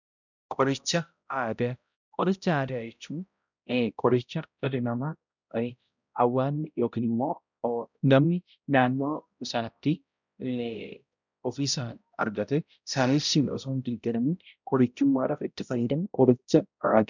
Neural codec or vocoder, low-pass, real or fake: codec, 16 kHz, 0.5 kbps, X-Codec, HuBERT features, trained on balanced general audio; 7.2 kHz; fake